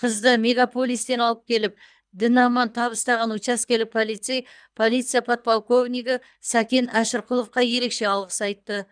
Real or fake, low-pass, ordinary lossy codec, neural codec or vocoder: fake; 9.9 kHz; none; codec, 24 kHz, 3 kbps, HILCodec